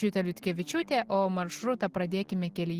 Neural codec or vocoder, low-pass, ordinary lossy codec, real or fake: none; 14.4 kHz; Opus, 16 kbps; real